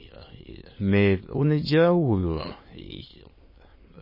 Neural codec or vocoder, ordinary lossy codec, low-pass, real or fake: autoencoder, 22.05 kHz, a latent of 192 numbers a frame, VITS, trained on many speakers; MP3, 24 kbps; 5.4 kHz; fake